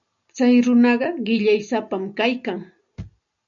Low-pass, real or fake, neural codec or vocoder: 7.2 kHz; real; none